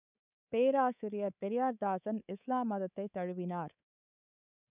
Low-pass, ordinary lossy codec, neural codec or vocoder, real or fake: 3.6 kHz; none; codec, 24 kHz, 3.1 kbps, DualCodec; fake